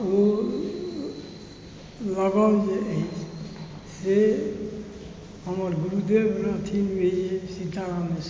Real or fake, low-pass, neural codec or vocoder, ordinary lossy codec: real; none; none; none